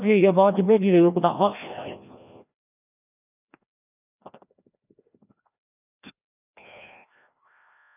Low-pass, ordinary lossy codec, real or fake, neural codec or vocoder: 3.6 kHz; none; fake; codec, 16 kHz, 1 kbps, FreqCodec, larger model